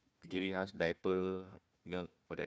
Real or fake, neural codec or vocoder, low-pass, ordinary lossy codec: fake; codec, 16 kHz, 1 kbps, FunCodec, trained on Chinese and English, 50 frames a second; none; none